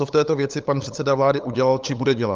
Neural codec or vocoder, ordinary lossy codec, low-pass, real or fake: codec, 16 kHz, 8 kbps, FunCodec, trained on LibriTTS, 25 frames a second; Opus, 32 kbps; 7.2 kHz; fake